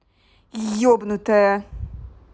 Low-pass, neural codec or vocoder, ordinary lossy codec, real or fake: none; none; none; real